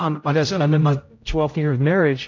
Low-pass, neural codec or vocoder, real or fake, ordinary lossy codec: 7.2 kHz; codec, 16 kHz, 0.5 kbps, X-Codec, HuBERT features, trained on general audio; fake; AAC, 48 kbps